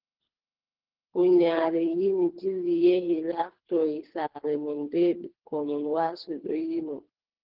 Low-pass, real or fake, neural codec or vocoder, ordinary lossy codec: 5.4 kHz; fake; codec, 24 kHz, 3 kbps, HILCodec; Opus, 16 kbps